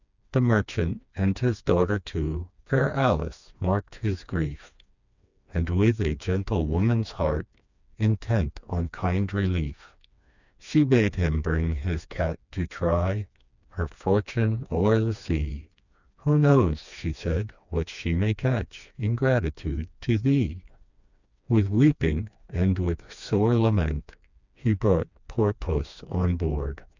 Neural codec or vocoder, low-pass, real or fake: codec, 16 kHz, 2 kbps, FreqCodec, smaller model; 7.2 kHz; fake